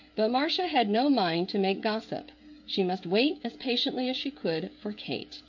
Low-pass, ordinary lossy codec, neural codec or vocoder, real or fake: 7.2 kHz; MP3, 48 kbps; codec, 16 kHz, 16 kbps, FreqCodec, smaller model; fake